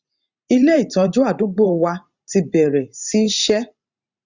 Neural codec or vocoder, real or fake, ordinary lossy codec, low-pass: vocoder, 44.1 kHz, 128 mel bands every 512 samples, BigVGAN v2; fake; Opus, 64 kbps; 7.2 kHz